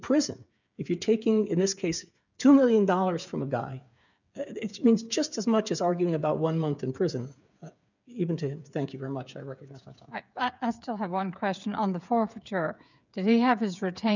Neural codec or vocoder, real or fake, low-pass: codec, 16 kHz, 8 kbps, FreqCodec, smaller model; fake; 7.2 kHz